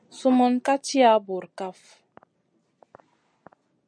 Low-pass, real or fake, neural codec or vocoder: 9.9 kHz; real; none